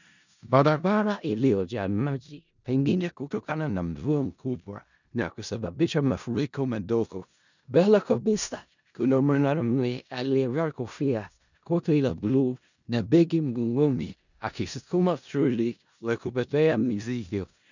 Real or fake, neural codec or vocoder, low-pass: fake; codec, 16 kHz in and 24 kHz out, 0.4 kbps, LongCat-Audio-Codec, four codebook decoder; 7.2 kHz